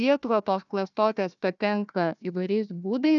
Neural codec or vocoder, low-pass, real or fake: codec, 16 kHz, 1 kbps, FunCodec, trained on Chinese and English, 50 frames a second; 7.2 kHz; fake